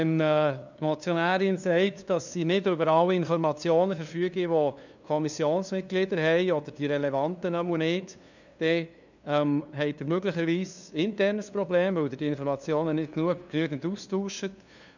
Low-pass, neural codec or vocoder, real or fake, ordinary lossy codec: 7.2 kHz; codec, 16 kHz, 2 kbps, FunCodec, trained on LibriTTS, 25 frames a second; fake; none